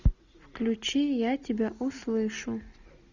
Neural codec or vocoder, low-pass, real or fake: none; 7.2 kHz; real